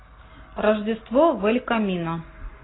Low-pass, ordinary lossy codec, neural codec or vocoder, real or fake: 7.2 kHz; AAC, 16 kbps; none; real